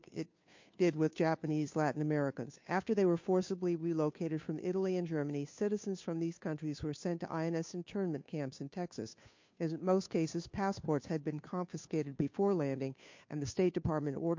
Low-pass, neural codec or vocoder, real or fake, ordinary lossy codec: 7.2 kHz; codec, 16 kHz, 2 kbps, FunCodec, trained on Chinese and English, 25 frames a second; fake; MP3, 48 kbps